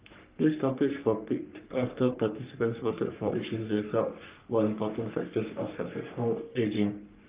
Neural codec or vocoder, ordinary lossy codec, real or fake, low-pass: codec, 44.1 kHz, 3.4 kbps, Pupu-Codec; Opus, 32 kbps; fake; 3.6 kHz